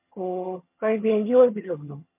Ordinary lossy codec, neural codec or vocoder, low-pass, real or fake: MP3, 32 kbps; vocoder, 22.05 kHz, 80 mel bands, HiFi-GAN; 3.6 kHz; fake